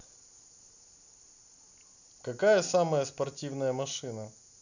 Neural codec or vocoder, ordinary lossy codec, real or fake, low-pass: none; none; real; 7.2 kHz